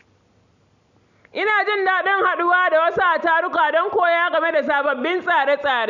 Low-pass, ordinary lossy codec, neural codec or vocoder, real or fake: 7.2 kHz; none; none; real